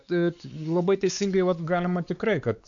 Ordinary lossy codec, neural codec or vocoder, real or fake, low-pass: AAC, 64 kbps; codec, 16 kHz, 4 kbps, X-Codec, WavLM features, trained on Multilingual LibriSpeech; fake; 7.2 kHz